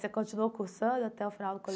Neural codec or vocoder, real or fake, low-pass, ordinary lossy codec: none; real; none; none